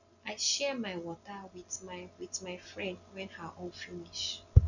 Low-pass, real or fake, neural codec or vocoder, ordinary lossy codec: 7.2 kHz; real; none; none